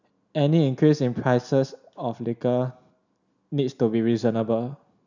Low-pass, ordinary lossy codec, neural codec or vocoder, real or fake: 7.2 kHz; none; none; real